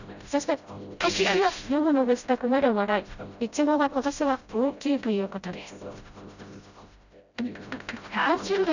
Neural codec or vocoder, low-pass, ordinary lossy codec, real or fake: codec, 16 kHz, 0.5 kbps, FreqCodec, smaller model; 7.2 kHz; none; fake